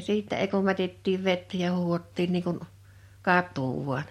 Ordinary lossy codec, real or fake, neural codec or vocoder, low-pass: MP3, 64 kbps; real; none; 19.8 kHz